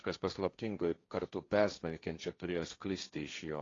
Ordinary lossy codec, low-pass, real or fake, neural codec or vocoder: AAC, 32 kbps; 7.2 kHz; fake; codec, 16 kHz, 1.1 kbps, Voila-Tokenizer